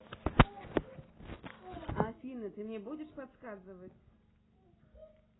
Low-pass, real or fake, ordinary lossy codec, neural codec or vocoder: 7.2 kHz; real; AAC, 16 kbps; none